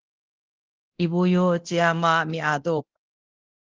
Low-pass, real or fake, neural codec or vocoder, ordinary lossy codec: 7.2 kHz; fake; codec, 24 kHz, 0.9 kbps, DualCodec; Opus, 16 kbps